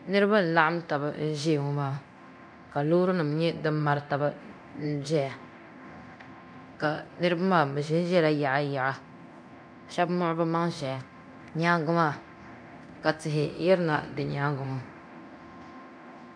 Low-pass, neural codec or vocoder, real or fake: 9.9 kHz; codec, 24 kHz, 0.9 kbps, DualCodec; fake